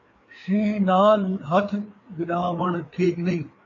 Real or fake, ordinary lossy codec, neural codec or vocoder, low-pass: fake; AAC, 32 kbps; codec, 16 kHz, 4 kbps, FreqCodec, larger model; 7.2 kHz